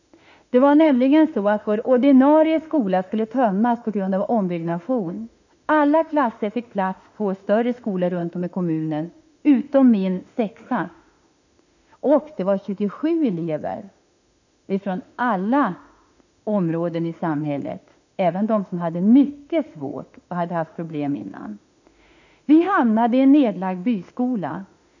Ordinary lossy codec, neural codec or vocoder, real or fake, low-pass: none; autoencoder, 48 kHz, 32 numbers a frame, DAC-VAE, trained on Japanese speech; fake; 7.2 kHz